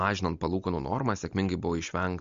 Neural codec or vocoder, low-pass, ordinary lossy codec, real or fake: none; 7.2 kHz; MP3, 48 kbps; real